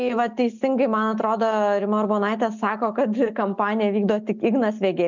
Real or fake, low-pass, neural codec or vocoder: real; 7.2 kHz; none